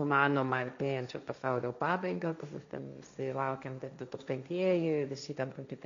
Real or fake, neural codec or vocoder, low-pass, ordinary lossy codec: fake; codec, 16 kHz, 1.1 kbps, Voila-Tokenizer; 7.2 kHz; MP3, 64 kbps